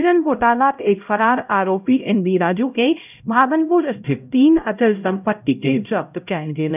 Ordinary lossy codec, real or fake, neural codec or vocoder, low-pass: none; fake; codec, 16 kHz, 0.5 kbps, X-Codec, HuBERT features, trained on LibriSpeech; 3.6 kHz